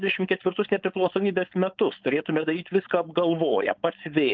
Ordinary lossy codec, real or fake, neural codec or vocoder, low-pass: Opus, 24 kbps; fake; codec, 16 kHz, 4.8 kbps, FACodec; 7.2 kHz